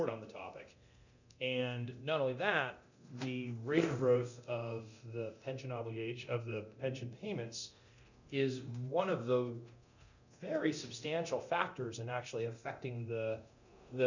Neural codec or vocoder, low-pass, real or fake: codec, 24 kHz, 0.9 kbps, DualCodec; 7.2 kHz; fake